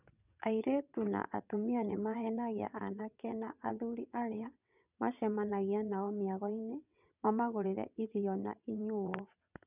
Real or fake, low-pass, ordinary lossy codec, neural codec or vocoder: fake; 3.6 kHz; none; vocoder, 22.05 kHz, 80 mel bands, WaveNeXt